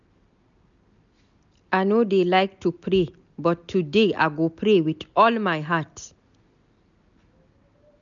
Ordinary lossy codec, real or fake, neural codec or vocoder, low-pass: none; real; none; 7.2 kHz